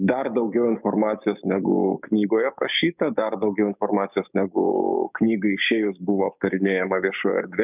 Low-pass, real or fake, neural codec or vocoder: 3.6 kHz; fake; codec, 16 kHz, 6 kbps, DAC